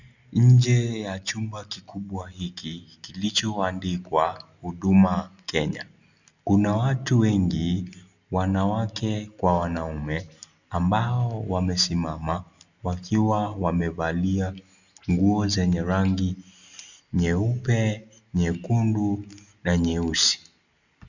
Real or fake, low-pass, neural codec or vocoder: real; 7.2 kHz; none